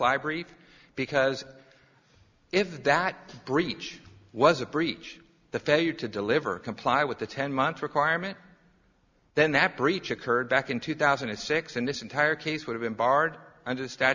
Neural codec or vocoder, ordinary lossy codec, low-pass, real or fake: none; Opus, 64 kbps; 7.2 kHz; real